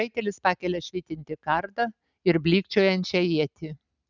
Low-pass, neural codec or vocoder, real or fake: 7.2 kHz; none; real